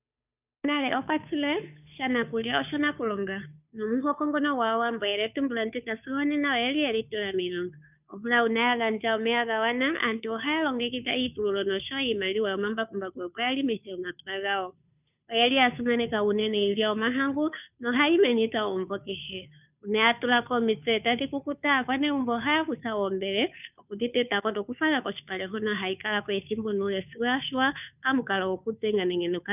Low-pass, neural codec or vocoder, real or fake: 3.6 kHz; codec, 16 kHz, 2 kbps, FunCodec, trained on Chinese and English, 25 frames a second; fake